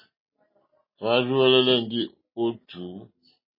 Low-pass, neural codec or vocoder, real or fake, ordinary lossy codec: 5.4 kHz; none; real; MP3, 24 kbps